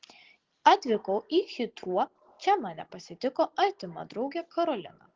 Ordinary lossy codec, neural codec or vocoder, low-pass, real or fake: Opus, 16 kbps; none; 7.2 kHz; real